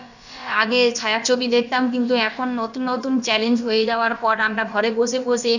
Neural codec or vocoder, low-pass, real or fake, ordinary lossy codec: codec, 16 kHz, about 1 kbps, DyCAST, with the encoder's durations; 7.2 kHz; fake; none